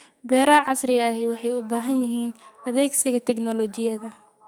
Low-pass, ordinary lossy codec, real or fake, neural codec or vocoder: none; none; fake; codec, 44.1 kHz, 2.6 kbps, SNAC